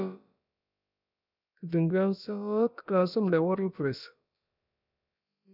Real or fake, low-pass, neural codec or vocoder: fake; 5.4 kHz; codec, 16 kHz, about 1 kbps, DyCAST, with the encoder's durations